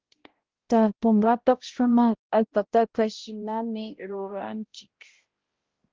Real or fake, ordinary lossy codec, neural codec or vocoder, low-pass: fake; Opus, 16 kbps; codec, 16 kHz, 0.5 kbps, X-Codec, HuBERT features, trained on balanced general audio; 7.2 kHz